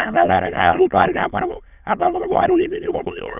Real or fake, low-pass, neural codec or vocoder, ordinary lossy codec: fake; 3.6 kHz; autoencoder, 22.05 kHz, a latent of 192 numbers a frame, VITS, trained on many speakers; none